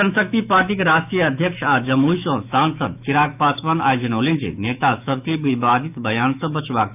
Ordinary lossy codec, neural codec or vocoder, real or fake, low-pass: none; autoencoder, 48 kHz, 128 numbers a frame, DAC-VAE, trained on Japanese speech; fake; 3.6 kHz